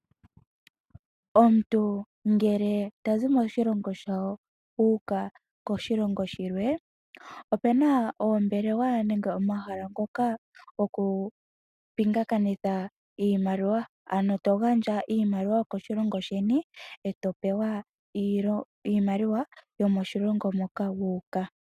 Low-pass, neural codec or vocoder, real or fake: 14.4 kHz; none; real